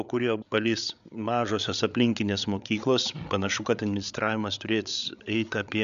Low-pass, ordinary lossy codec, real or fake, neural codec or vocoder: 7.2 kHz; MP3, 96 kbps; fake; codec, 16 kHz, 8 kbps, FreqCodec, larger model